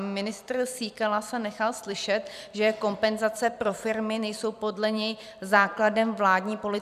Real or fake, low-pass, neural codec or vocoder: fake; 14.4 kHz; vocoder, 44.1 kHz, 128 mel bands every 256 samples, BigVGAN v2